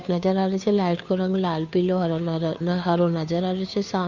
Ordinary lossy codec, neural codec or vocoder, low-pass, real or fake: none; codec, 16 kHz, 2 kbps, FunCodec, trained on Chinese and English, 25 frames a second; 7.2 kHz; fake